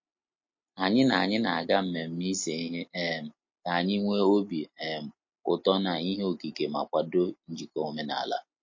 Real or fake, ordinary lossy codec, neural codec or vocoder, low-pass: real; MP3, 32 kbps; none; 7.2 kHz